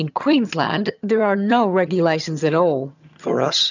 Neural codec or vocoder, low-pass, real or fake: vocoder, 22.05 kHz, 80 mel bands, HiFi-GAN; 7.2 kHz; fake